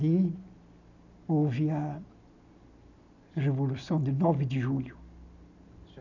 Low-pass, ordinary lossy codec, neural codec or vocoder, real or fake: 7.2 kHz; none; none; real